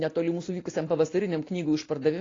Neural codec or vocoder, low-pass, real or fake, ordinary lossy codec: none; 7.2 kHz; real; AAC, 32 kbps